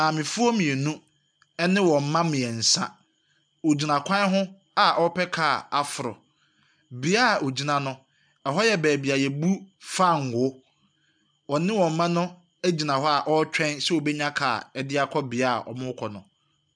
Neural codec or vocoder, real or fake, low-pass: none; real; 9.9 kHz